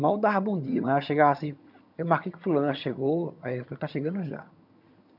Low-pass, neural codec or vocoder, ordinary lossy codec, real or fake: 5.4 kHz; vocoder, 22.05 kHz, 80 mel bands, HiFi-GAN; none; fake